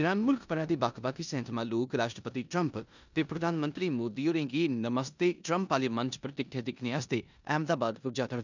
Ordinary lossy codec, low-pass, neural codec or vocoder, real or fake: none; 7.2 kHz; codec, 16 kHz in and 24 kHz out, 0.9 kbps, LongCat-Audio-Codec, four codebook decoder; fake